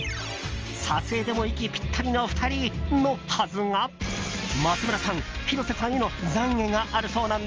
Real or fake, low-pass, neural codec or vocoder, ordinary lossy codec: real; 7.2 kHz; none; Opus, 24 kbps